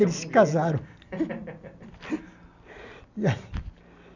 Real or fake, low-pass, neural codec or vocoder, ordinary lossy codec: real; 7.2 kHz; none; none